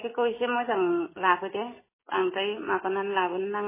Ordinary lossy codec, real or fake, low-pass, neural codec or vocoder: MP3, 16 kbps; real; 3.6 kHz; none